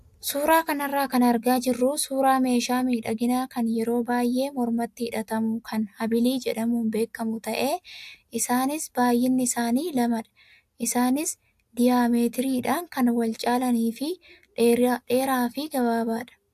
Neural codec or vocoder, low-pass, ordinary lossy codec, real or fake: none; 14.4 kHz; AAC, 96 kbps; real